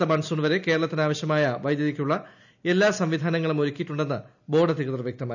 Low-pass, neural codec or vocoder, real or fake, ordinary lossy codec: 7.2 kHz; none; real; none